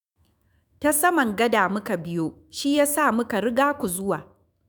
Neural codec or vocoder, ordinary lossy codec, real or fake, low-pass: autoencoder, 48 kHz, 128 numbers a frame, DAC-VAE, trained on Japanese speech; none; fake; none